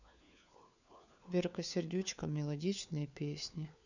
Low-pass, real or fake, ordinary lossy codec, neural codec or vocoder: 7.2 kHz; fake; none; codec, 16 kHz, 4 kbps, FunCodec, trained on LibriTTS, 50 frames a second